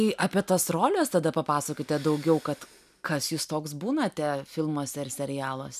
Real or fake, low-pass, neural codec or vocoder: real; 14.4 kHz; none